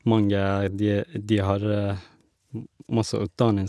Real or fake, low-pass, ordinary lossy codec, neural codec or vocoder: real; none; none; none